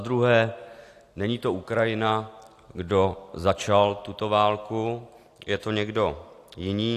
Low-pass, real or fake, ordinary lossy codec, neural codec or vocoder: 14.4 kHz; real; AAC, 64 kbps; none